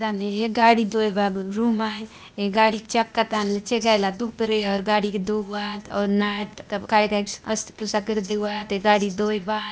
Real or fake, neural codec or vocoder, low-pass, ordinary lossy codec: fake; codec, 16 kHz, 0.8 kbps, ZipCodec; none; none